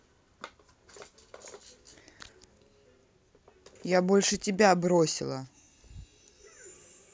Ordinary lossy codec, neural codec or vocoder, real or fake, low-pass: none; none; real; none